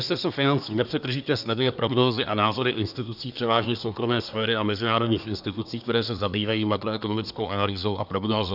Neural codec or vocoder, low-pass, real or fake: codec, 24 kHz, 1 kbps, SNAC; 5.4 kHz; fake